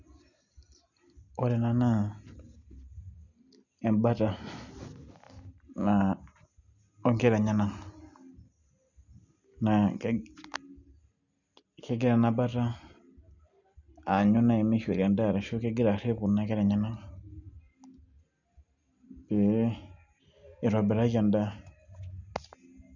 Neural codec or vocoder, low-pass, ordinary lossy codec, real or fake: none; 7.2 kHz; none; real